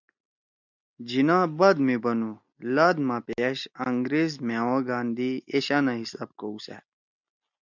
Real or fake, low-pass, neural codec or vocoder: real; 7.2 kHz; none